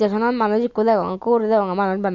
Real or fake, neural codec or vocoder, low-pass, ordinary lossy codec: real; none; 7.2 kHz; Opus, 64 kbps